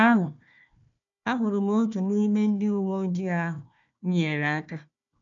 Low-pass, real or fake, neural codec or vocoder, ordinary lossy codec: 7.2 kHz; fake; codec, 16 kHz, 1 kbps, FunCodec, trained on Chinese and English, 50 frames a second; none